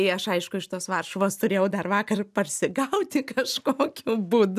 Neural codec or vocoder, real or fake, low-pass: none; real; 14.4 kHz